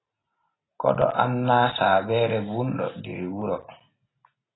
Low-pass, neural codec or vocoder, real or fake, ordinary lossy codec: 7.2 kHz; none; real; AAC, 16 kbps